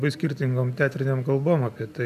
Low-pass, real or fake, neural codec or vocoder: 14.4 kHz; real; none